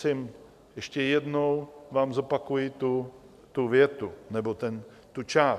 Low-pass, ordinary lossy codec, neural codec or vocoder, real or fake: 14.4 kHz; MP3, 96 kbps; none; real